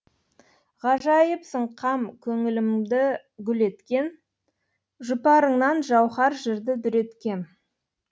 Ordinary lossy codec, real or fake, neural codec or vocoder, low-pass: none; real; none; none